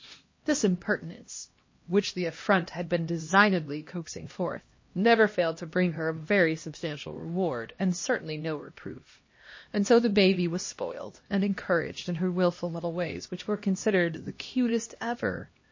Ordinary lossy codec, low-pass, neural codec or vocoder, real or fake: MP3, 32 kbps; 7.2 kHz; codec, 16 kHz, 1 kbps, X-Codec, HuBERT features, trained on LibriSpeech; fake